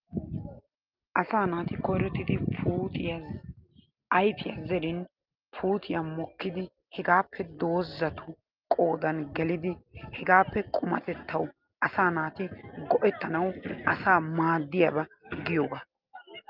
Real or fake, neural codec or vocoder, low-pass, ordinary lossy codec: real; none; 5.4 kHz; Opus, 24 kbps